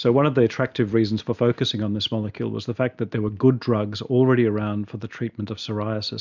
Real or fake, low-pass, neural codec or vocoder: real; 7.2 kHz; none